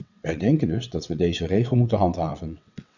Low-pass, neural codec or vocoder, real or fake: 7.2 kHz; vocoder, 22.05 kHz, 80 mel bands, WaveNeXt; fake